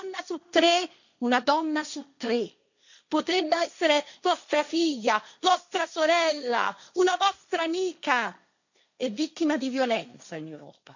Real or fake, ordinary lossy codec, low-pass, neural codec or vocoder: fake; none; 7.2 kHz; codec, 16 kHz, 1.1 kbps, Voila-Tokenizer